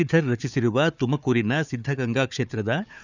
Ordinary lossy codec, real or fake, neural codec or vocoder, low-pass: none; fake; codec, 16 kHz, 4 kbps, FunCodec, trained on Chinese and English, 50 frames a second; 7.2 kHz